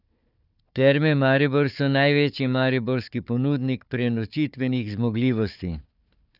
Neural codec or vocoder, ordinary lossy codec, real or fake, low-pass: codec, 16 kHz, 4 kbps, FunCodec, trained on Chinese and English, 50 frames a second; none; fake; 5.4 kHz